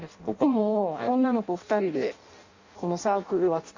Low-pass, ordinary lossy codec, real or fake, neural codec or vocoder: 7.2 kHz; Opus, 64 kbps; fake; codec, 16 kHz in and 24 kHz out, 0.6 kbps, FireRedTTS-2 codec